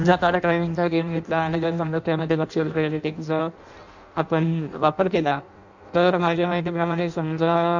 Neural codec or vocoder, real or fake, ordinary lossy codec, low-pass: codec, 16 kHz in and 24 kHz out, 0.6 kbps, FireRedTTS-2 codec; fake; none; 7.2 kHz